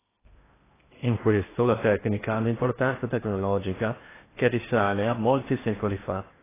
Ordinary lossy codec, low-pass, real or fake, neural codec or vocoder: AAC, 16 kbps; 3.6 kHz; fake; codec, 16 kHz in and 24 kHz out, 0.8 kbps, FocalCodec, streaming, 65536 codes